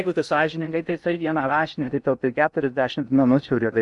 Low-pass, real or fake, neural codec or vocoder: 10.8 kHz; fake; codec, 16 kHz in and 24 kHz out, 0.6 kbps, FocalCodec, streaming, 2048 codes